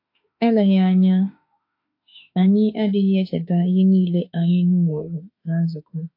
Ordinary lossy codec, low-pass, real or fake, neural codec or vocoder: AAC, 32 kbps; 5.4 kHz; fake; autoencoder, 48 kHz, 32 numbers a frame, DAC-VAE, trained on Japanese speech